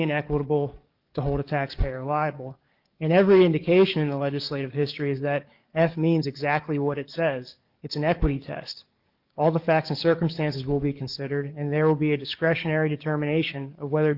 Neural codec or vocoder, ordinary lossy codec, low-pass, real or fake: codec, 44.1 kHz, 7.8 kbps, DAC; Opus, 32 kbps; 5.4 kHz; fake